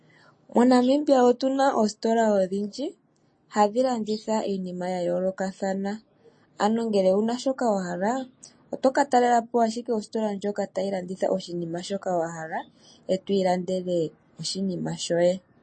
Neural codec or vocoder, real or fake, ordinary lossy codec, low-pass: none; real; MP3, 32 kbps; 9.9 kHz